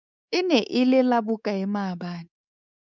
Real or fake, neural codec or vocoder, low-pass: fake; codec, 24 kHz, 3.1 kbps, DualCodec; 7.2 kHz